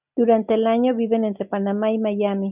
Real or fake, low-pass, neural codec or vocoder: real; 3.6 kHz; none